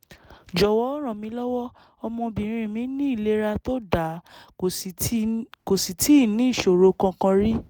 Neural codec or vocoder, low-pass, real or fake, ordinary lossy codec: none; none; real; none